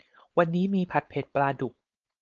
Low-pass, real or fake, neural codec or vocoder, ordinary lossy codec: 7.2 kHz; fake; codec, 16 kHz, 4.8 kbps, FACodec; Opus, 24 kbps